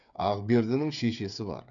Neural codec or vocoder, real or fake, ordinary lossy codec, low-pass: codec, 16 kHz, 8 kbps, FreqCodec, smaller model; fake; none; 7.2 kHz